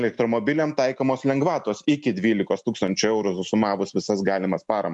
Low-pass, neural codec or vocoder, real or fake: 10.8 kHz; none; real